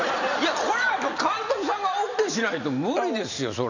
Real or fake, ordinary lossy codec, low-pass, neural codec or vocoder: real; none; 7.2 kHz; none